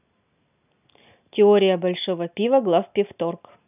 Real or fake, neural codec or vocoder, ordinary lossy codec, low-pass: real; none; none; 3.6 kHz